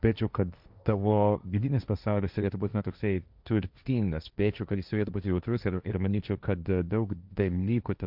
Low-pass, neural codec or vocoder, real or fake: 5.4 kHz; codec, 16 kHz, 1.1 kbps, Voila-Tokenizer; fake